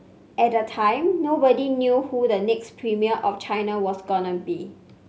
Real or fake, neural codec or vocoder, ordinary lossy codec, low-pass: real; none; none; none